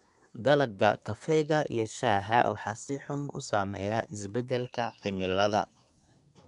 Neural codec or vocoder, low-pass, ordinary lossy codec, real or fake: codec, 24 kHz, 1 kbps, SNAC; 10.8 kHz; none; fake